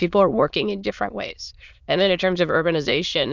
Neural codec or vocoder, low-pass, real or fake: autoencoder, 22.05 kHz, a latent of 192 numbers a frame, VITS, trained on many speakers; 7.2 kHz; fake